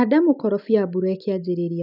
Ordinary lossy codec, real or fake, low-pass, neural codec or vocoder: none; real; 5.4 kHz; none